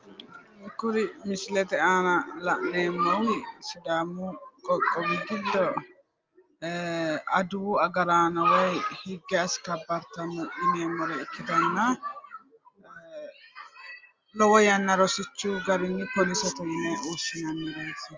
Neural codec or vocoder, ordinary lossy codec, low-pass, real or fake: none; Opus, 32 kbps; 7.2 kHz; real